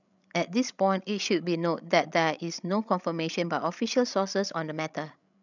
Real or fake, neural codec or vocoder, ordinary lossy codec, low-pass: fake; codec, 16 kHz, 16 kbps, FreqCodec, larger model; none; 7.2 kHz